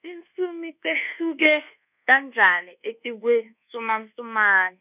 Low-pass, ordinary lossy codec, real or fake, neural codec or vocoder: 3.6 kHz; none; fake; codec, 24 kHz, 1.2 kbps, DualCodec